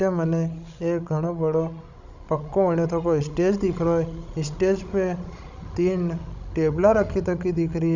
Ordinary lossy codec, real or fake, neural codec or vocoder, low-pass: none; fake; codec, 16 kHz, 16 kbps, FreqCodec, larger model; 7.2 kHz